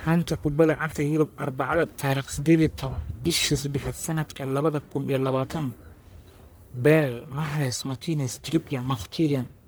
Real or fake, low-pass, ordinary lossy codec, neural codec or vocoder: fake; none; none; codec, 44.1 kHz, 1.7 kbps, Pupu-Codec